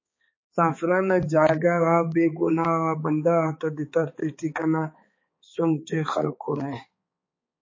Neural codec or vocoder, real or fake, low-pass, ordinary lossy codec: codec, 16 kHz, 4 kbps, X-Codec, HuBERT features, trained on balanced general audio; fake; 7.2 kHz; MP3, 32 kbps